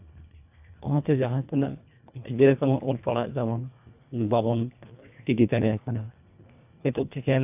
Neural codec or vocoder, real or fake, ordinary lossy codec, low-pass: codec, 24 kHz, 1.5 kbps, HILCodec; fake; AAC, 32 kbps; 3.6 kHz